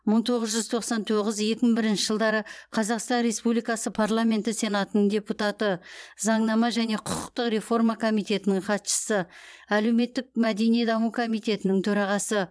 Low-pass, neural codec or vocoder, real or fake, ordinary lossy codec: none; vocoder, 22.05 kHz, 80 mel bands, Vocos; fake; none